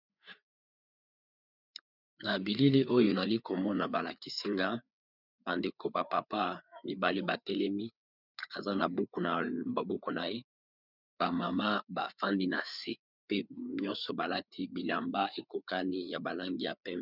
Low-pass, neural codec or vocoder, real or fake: 5.4 kHz; codec, 16 kHz, 4 kbps, FreqCodec, larger model; fake